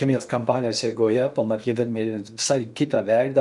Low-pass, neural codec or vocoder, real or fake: 10.8 kHz; codec, 16 kHz in and 24 kHz out, 0.8 kbps, FocalCodec, streaming, 65536 codes; fake